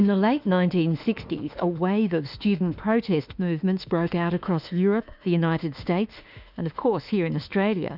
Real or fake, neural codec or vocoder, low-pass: fake; autoencoder, 48 kHz, 32 numbers a frame, DAC-VAE, trained on Japanese speech; 5.4 kHz